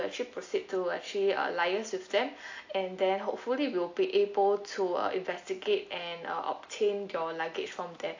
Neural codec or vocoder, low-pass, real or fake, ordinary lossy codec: none; 7.2 kHz; real; AAC, 48 kbps